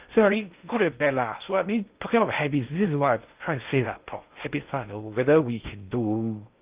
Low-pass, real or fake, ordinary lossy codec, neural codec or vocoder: 3.6 kHz; fake; Opus, 24 kbps; codec, 16 kHz in and 24 kHz out, 0.6 kbps, FocalCodec, streaming, 2048 codes